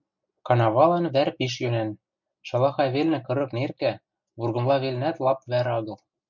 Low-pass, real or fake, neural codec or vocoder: 7.2 kHz; real; none